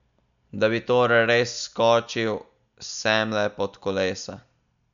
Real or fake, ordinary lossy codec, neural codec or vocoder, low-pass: real; none; none; 7.2 kHz